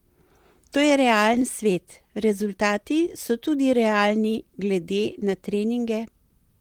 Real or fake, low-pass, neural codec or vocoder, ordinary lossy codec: fake; 19.8 kHz; vocoder, 44.1 kHz, 128 mel bands, Pupu-Vocoder; Opus, 24 kbps